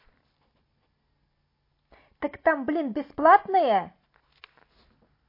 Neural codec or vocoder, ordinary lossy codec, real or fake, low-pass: none; MP3, 32 kbps; real; 5.4 kHz